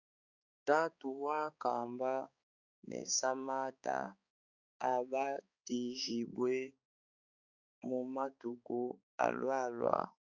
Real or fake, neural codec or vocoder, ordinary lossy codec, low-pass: fake; codec, 16 kHz, 4 kbps, X-Codec, HuBERT features, trained on balanced general audio; Opus, 64 kbps; 7.2 kHz